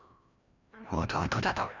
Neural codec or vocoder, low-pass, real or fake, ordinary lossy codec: codec, 16 kHz, 1 kbps, FreqCodec, larger model; 7.2 kHz; fake; none